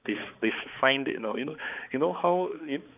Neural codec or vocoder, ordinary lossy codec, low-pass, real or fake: codec, 16 kHz, 4 kbps, X-Codec, HuBERT features, trained on balanced general audio; none; 3.6 kHz; fake